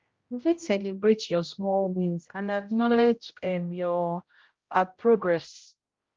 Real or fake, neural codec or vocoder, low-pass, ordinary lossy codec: fake; codec, 16 kHz, 0.5 kbps, X-Codec, HuBERT features, trained on general audio; 7.2 kHz; Opus, 32 kbps